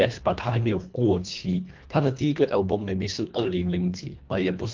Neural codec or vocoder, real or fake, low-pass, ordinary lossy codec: codec, 24 kHz, 1.5 kbps, HILCodec; fake; 7.2 kHz; Opus, 24 kbps